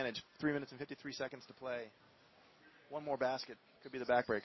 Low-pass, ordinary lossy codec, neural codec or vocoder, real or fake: 7.2 kHz; MP3, 24 kbps; none; real